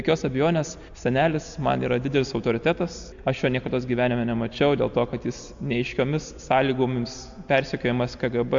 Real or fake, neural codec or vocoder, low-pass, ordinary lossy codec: real; none; 7.2 kHz; AAC, 64 kbps